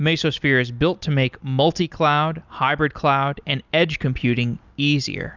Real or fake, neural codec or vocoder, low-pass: real; none; 7.2 kHz